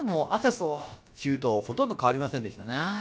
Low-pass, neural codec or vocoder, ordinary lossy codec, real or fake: none; codec, 16 kHz, about 1 kbps, DyCAST, with the encoder's durations; none; fake